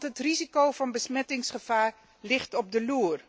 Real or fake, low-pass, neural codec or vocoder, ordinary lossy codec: real; none; none; none